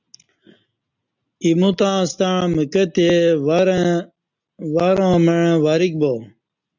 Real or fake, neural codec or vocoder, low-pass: real; none; 7.2 kHz